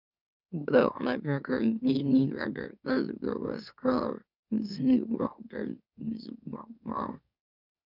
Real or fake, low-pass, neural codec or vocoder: fake; 5.4 kHz; autoencoder, 44.1 kHz, a latent of 192 numbers a frame, MeloTTS